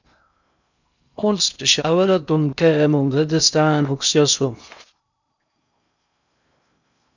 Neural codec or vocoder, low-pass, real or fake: codec, 16 kHz in and 24 kHz out, 0.6 kbps, FocalCodec, streaming, 2048 codes; 7.2 kHz; fake